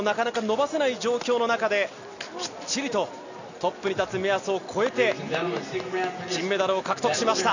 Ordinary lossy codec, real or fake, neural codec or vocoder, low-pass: none; real; none; 7.2 kHz